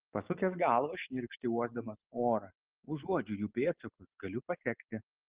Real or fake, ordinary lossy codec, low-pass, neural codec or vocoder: real; Opus, 16 kbps; 3.6 kHz; none